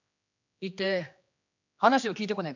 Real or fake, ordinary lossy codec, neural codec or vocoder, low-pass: fake; none; codec, 16 kHz, 2 kbps, X-Codec, HuBERT features, trained on general audio; 7.2 kHz